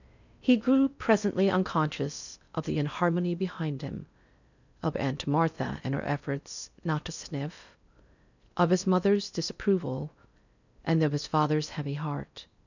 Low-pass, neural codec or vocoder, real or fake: 7.2 kHz; codec, 16 kHz in and 24 kHz out, 0.6 kbps, FocalCodec, streaming, 4096 codes; fake